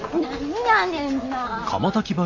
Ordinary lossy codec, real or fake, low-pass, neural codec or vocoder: AAC, 48 kbps; real; 7.2 kHz; none